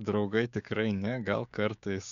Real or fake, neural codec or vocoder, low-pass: fake; codec, 16 kHz, 6 kbps, DAC; 7.2 kHz